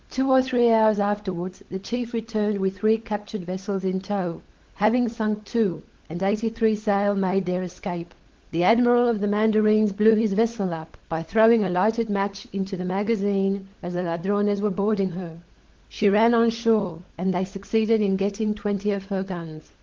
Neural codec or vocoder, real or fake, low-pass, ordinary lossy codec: codec, 16 kHz, 16 kbps, FunCodec, trained on LibriTTS, 50 frames a second; fake; 7.2 kHz; Opus, 16 kbps